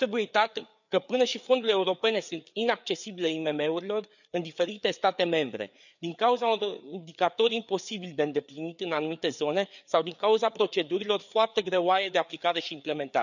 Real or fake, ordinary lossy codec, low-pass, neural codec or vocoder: fake; none; 7.2 kHz; codec, 16 kHz, 4 kbps, FreqCodec, larger model